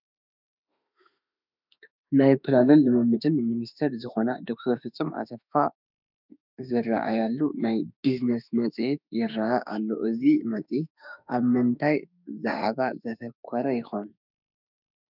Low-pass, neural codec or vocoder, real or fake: 5.4 kHz; autoencoder, 48 kHz, 32 numbers a frame, DAC-VAE, trained on Japanese speech; fake